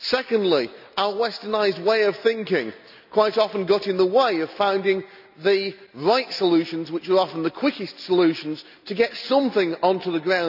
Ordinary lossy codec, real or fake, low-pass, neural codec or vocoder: none; real; 5.4 kHz; none